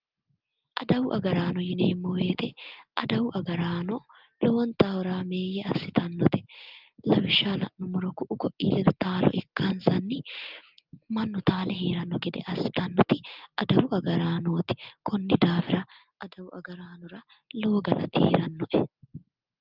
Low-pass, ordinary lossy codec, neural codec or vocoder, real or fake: 5.4 kHz; Opus, 32 kbps; none; real